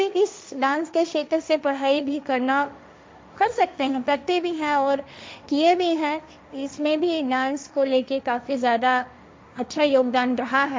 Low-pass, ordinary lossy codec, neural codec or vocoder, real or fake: none; none; codec, 16 kHz, 1.1 kbps, Voila-Tokenizer; fake